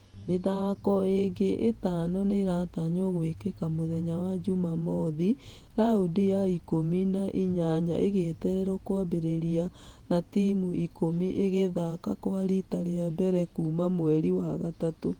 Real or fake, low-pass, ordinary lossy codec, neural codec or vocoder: fake; 19.8 kHz; Opus, 24 kbps; vocoder, 48 kHz, 128 mel bands, Vocos